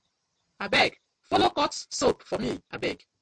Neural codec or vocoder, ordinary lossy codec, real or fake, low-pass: none; MP3, 64 kbps; real; 9.9 kHz